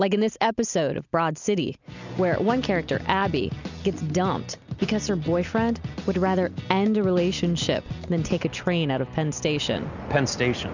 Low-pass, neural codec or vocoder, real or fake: 7.2 kHz; none; real